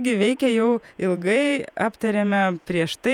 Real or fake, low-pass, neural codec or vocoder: fake; 19.8 kHz; vocoder, 48 kHz, 128 mel bands, Vocos